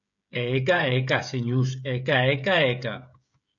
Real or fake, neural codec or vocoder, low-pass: fake; codec, 16 kHz, 16 kbps, FreqCodec, smaller model; 7.2 kHz